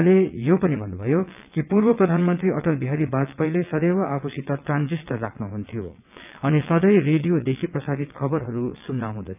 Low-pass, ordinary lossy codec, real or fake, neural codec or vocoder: 3.6 kHz; none; fake; vocoder, 22.05 kHz, 80 mel bands, WaveNeXt